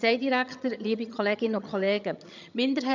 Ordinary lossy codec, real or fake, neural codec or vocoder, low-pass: none; fake; vocoder, 22.05 kHz, 80 mel bands, HiFi-GAN; 7.2 kHz